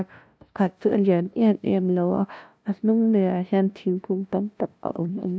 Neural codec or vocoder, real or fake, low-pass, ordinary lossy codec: codec, 16 kHz, 0.5 kbps, FunCodec, trained on LibriTTS, 25 frames a second; fake; none; none